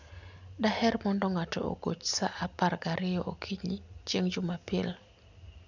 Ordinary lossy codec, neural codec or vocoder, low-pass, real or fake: none; none; 7.2 kHz; real